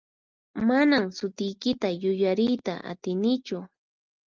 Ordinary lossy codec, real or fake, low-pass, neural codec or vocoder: Opus, 32 kbps; real; 7.2 kHz; none